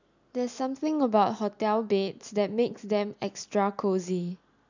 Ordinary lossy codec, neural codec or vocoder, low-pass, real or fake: none; none; 7.2 kHz; real